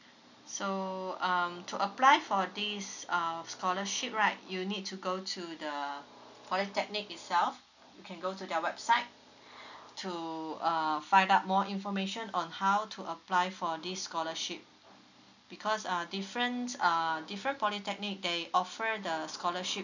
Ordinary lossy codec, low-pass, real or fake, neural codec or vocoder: none; 7.2 kHz; real; none